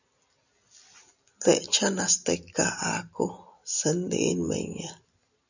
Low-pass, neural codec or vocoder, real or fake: 7.2 kHz; none; real